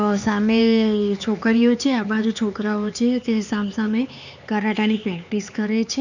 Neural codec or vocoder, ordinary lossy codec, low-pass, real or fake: codec, 16 kHz, 4 kbps, X-Codec, HuBERT features, trained on LibriSpeech; none; 7.2 kHz; fake